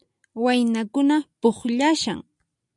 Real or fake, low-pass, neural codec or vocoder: real; 10.8 kHz; none